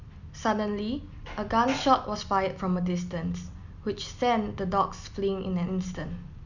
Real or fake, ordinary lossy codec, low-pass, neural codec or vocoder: real; none; 7.2 kHz; none